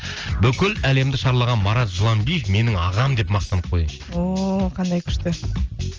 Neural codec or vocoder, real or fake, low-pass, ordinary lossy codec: none; real; 7.2 kHz; Opus, 24 kbps